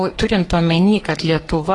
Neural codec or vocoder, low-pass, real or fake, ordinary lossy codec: codec, 44.1 kHz, 3.4 kbps, Pupu-Codec; 10.8 kHz; fake; AAC, 32 kbps